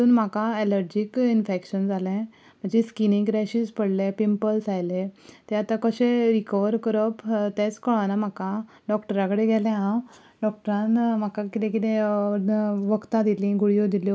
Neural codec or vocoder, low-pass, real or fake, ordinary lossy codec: none; none; real; none